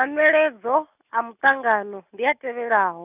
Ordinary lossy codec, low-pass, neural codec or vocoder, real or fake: none; 3.6 kHz; none; real